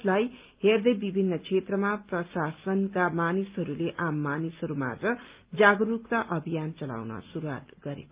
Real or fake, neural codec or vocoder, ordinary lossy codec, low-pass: real; none; Opus, 32 kbps; 3.6 kHz